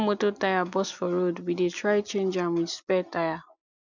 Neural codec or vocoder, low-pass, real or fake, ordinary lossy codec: none; 7.2 kHz; real; none